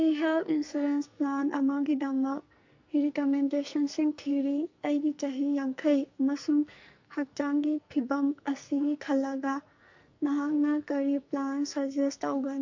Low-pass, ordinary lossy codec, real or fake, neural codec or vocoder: 7.2 kHz; MP3, 48 kbps; fake; codec, 44.1 kHz, 2.6 kbps, SNAC